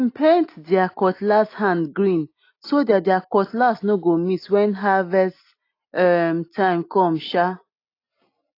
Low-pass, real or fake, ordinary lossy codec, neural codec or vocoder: 5.4 kHz; real; AAC, 32 kbps; none